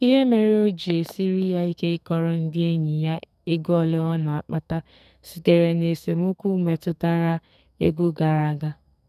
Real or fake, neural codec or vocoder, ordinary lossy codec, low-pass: fake; codec, 44.1 kHz, 2.6 kbps, SNAC; none; 14.4 kHz